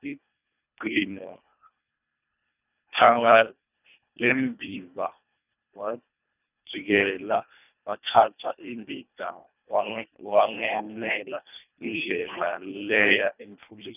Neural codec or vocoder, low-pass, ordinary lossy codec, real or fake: codec, 24 kHz, 1.5 kbps, HILCodec; 3.6 kHz; none; fake